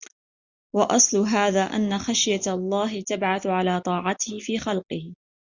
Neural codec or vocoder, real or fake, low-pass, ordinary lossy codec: none; real; 7.2 kHz; Opus, 64 kbps